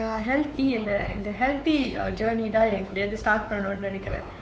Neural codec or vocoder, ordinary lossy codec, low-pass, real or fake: codec, 16 kHz, 4 kbps, X-Codec, WavLM features, trained on Multilingual LibriSpeech; none; none; fake